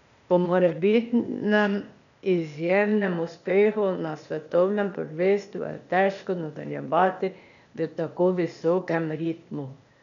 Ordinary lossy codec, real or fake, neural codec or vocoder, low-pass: none; fake; codec, 16 kHz, 0.8 kbps, ZipCodec; 7.2 kHz